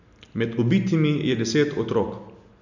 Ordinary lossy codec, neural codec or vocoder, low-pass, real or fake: none; none; 7.2 kHz; real